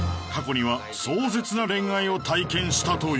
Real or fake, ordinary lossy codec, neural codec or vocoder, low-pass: real; none; none; none